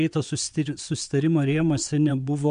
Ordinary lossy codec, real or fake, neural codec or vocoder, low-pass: MP3, 64 kbps; fake; vocoder, 22.05 kHz, 80 mel bands, WaveNeXt; 9.9 kHz